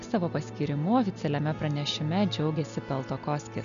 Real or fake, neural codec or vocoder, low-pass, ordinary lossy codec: real; none; 7.2 kHz; MP3, 96 kbps